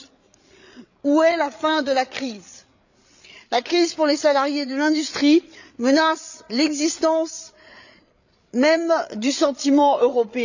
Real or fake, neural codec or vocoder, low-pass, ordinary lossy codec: fake; codec, 16 kHz, 8 kbps, FreqCodec, larger model; 7.2 kHz; none